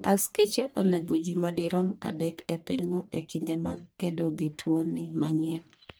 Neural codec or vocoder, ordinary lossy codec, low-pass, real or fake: codec, 44.1 kHz, 1.7 kbps, Pupu-Codec; none; none; fake